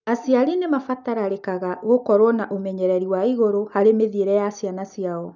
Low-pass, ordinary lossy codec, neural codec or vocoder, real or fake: 7.2 kHz; none; none; real